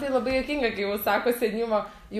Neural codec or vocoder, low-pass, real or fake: none; 14.4 kHz; real